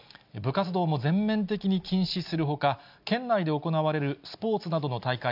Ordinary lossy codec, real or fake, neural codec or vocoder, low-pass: none; real; none; 5.4 kHz